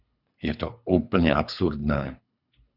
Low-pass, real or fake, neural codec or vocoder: 5.4 kHz; fake; codec, 24 kHz, 3 kbps, HILCodec